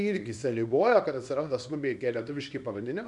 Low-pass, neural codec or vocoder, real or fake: 10.8 kHz; codec, 24 kHz, 0.9 kbps, WavTokenizer, small release; fake